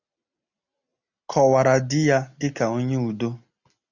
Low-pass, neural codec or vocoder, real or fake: 7.2 kHz; none; real